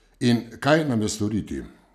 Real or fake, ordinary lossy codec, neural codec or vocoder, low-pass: real; none; none; 14.4 kHz